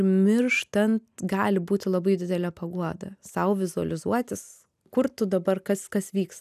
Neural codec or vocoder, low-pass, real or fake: none; 14.4 kHz; real